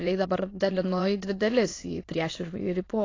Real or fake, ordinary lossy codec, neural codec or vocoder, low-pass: fake; AAC, 32 kbps; autoencoder, 22.05 kHz, a latent of 192 numbers a frame, VITS, trained on many speakers; 7.2 kHz